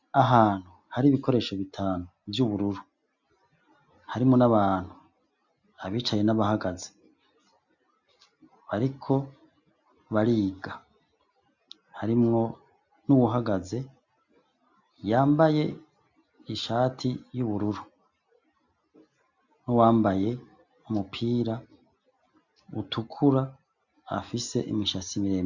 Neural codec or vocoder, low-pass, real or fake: none; 7.2 kHz; real